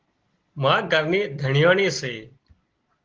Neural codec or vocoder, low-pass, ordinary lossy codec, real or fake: none; 7.2 kHz; Opus, 16 kbps; real